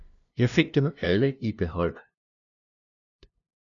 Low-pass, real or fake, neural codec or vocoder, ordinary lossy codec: 7.2 kHz; fake; codec, 16 kHz, 0.5 kbps, FunCodec, trained on LibriTTS, 25 frames a second; MP3, 96 kbps